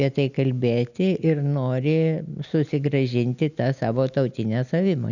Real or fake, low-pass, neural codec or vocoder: real; 7.2 kHz; none